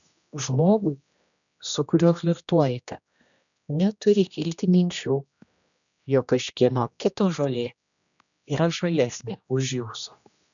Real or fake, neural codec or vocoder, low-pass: fake; codec, 16 kHz, 1 kbps, X-Codec, HuBERT features, trained on general audio; 7.2 kHz